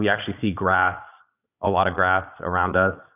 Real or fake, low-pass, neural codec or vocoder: fake; 3.6 kHz; vocoder, 22.05 kHz, 80 mel bands, Vocos